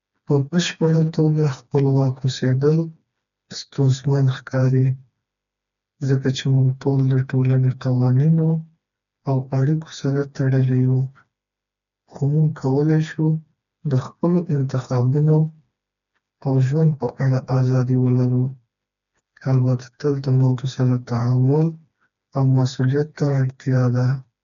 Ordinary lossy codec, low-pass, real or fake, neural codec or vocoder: none; 7.2 kHz; fake; codec, 16 kHz, 2 kbps, FreqCodec, smaller model